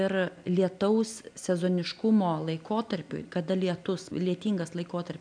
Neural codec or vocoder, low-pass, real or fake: none; 9.9 kHz; real